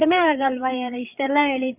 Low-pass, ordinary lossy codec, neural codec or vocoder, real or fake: 3.6 kHz; none; codec, 16 kHz, 8 kbps, FreqCodec, larger model; fake